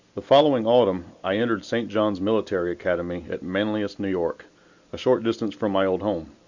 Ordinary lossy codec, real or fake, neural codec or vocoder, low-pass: Opus, 64 kbps; real; none; 7.2 kHz